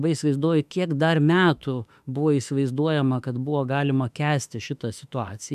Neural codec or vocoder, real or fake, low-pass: autoencoder, 48 kHz, 32 numbers a frame, DAC-VAE, trained on Japanese speech; fake; 14.4 kHz